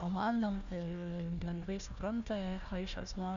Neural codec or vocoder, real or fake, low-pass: codec, 16 kHz, 1 kbps, FunCodec, trained on Chinese and English, 50 frames a second; fake; 7.2 kHz